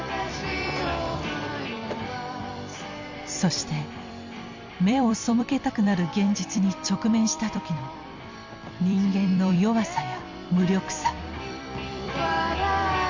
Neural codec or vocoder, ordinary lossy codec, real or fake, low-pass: vocoder, 44.1 kHz, 128 mel bands every 256 samples, BigVGAN v2; Opus, 64 kbps; fake; 7.2 kHz